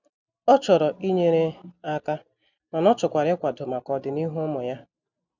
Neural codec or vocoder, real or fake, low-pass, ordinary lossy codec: none; real; 7.2 kHz; none